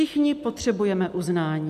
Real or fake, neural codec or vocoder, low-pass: real; none; 14.4 kHz